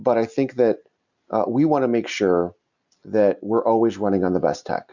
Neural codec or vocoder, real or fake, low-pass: none; real; 7.2 kHz